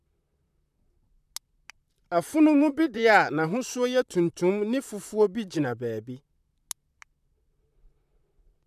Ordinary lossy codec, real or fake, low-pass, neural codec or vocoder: none; fake; 14.4 kHz; vocoder, 44.1 kHz, 128 mel bands, Pupu-Vocoder